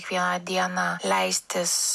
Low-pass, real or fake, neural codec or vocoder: 14.4 kHz; real; none